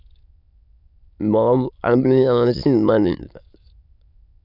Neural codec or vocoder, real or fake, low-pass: autoencoder, 22.05 kHz, a latent of 192 numbers a frame, VITS, trained on many speakers; fake; 5.4 kHz